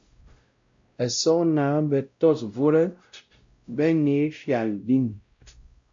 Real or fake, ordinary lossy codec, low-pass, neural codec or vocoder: fake; MP3, 48 kbps; 7.2 kHz; codec, 16 kHz, 0.5 kbps, X-Codec, WavLM features, trained on Multilingual LibriSpeech